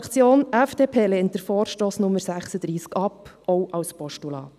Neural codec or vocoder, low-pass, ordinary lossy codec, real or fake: none; 14.4 kHz; none; real